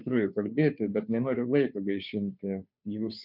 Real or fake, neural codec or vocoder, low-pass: fake; codec, 16 kHz, 2 kbps, FunCodec, trained on Chinese and English, 25 frames a second; 5.4 kHz